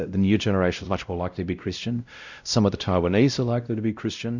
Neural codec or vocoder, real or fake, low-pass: codec, 16 kHz, 0.5 kbps, X-Codec, WavLM features, trained on Multilingual LibriSpeech; fake; 7.2 kHz